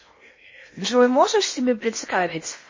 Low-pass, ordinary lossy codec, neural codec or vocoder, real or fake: 7.2 kHz; MP3, 32 kbps; codec, 16 kHz in and 24 kHz out, 0.8 kbps, FocalCodec, streaming, 65536 codes; fake